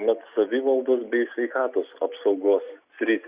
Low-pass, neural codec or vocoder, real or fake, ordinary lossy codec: 3.6 kHz; none; real; Opus, 64 kbps